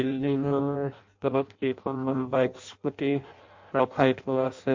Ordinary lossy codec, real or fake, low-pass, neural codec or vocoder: MP3, 48 kbps; fake; 7.2 kHz; codec, 16 kHz in and 24 kHz out, 0.6 kbps, FireRedTTS-2 codec